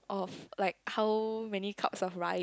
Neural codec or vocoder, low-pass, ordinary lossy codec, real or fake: none; none; none; real